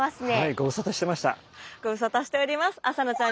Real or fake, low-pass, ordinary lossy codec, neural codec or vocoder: real; none; none; none